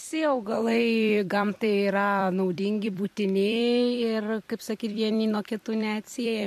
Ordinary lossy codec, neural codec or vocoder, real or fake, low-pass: MP3, 64 kbps; vocoder, 44.1 kHz, 128 mel bands every 256 samples, BigVGAN v2; fake; 14.4 kHz